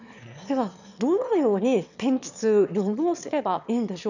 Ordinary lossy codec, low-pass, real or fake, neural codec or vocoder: none; 7.2 kHz; fake; autoencoder, 22.05 kHz, a latent of 192 numbers a frame, VITS, trained on one speaker